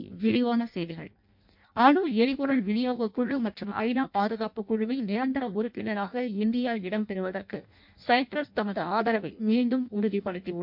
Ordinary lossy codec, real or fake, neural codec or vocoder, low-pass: MP3, 48 kbps; fake; codec, 16 kHz in and 24 kHz out, 0.6 kbps, FireRedTTS-2 codec; 5.4 kHz